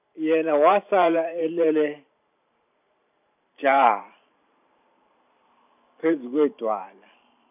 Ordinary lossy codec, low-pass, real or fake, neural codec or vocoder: none; 3.6 kHz; fake; vocoder, 44.1 kHz, 128 mel bands every 512 samples, BigVGAN v2